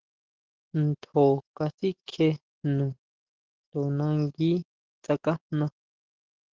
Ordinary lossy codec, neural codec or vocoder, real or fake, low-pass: Opus, 16 kbps; none; real; 7.2 kHz